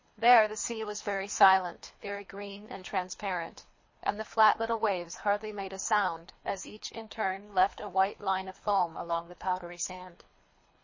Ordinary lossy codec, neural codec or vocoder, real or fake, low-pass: MP3, 32 kbps; codec, 24 kHz, 3 kbps, HILCodec; fake; 7.2 kHz